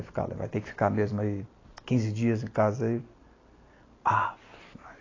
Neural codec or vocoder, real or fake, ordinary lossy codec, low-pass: none; real; AAC, 32 kbps; 7.2 kHz